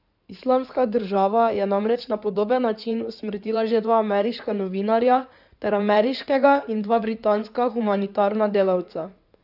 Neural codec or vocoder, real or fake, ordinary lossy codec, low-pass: codec, 16 kHz in and 24 kHz out, 2.2 kbps, FireRedTTS-2 codec; fake; none; 5.4 kHz